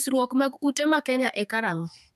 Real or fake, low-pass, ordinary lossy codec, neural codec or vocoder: fake; 14.4 kHz; none; codec, 32 kHz, 1.9 kbps, SNAC